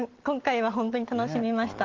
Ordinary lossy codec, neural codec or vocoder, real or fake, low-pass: Opus, 16 kbps; none; real; 7.2 kHz